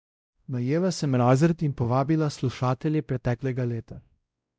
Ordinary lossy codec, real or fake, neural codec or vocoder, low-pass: none; fake; codec, 16 kHz, 0.5 kbps, X-Codec, WavLM features, trained on Multilingual LibriSpeech; none